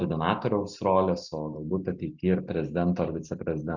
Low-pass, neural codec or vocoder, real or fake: 7.2 kHz; none; real